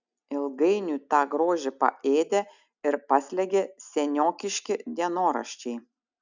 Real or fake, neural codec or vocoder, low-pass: real; none; 7.2 kHz